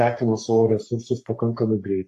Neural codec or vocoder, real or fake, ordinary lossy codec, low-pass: codec, 44.1 kHz, 3.4 kbps, Pupu-Codec; fake; AAC, 64 kbps; 14.4 kHz